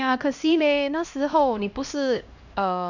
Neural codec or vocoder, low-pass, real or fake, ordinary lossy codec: codec, 16 kHz, 1 kbps, X-Codec, HuBERT features, trained on LibriSpeech; 7.2 kHz; fake; none